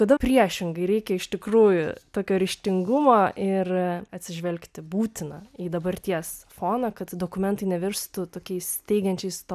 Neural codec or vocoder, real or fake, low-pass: none; real; 14.4 kHz